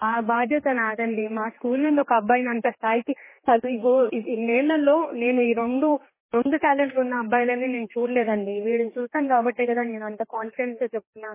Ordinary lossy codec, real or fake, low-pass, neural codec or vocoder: MP3, 16 kbps; fake; 3.6 kHz; codec, 16 kHz, 2 kbps, X-Codec, HuBERT features, trained on general audio